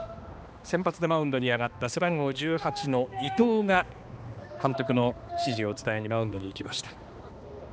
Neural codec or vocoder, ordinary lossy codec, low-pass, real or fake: codec, 16 kHz, 2 kbps, X-Codec, HuBERT features, trained on balanced general audio; none; none; fake